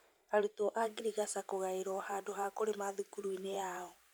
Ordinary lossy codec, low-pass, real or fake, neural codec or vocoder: none; none; fake; vocoder, 44.1 kHz, 128 mel bands every 512 samples, BigVGAN v2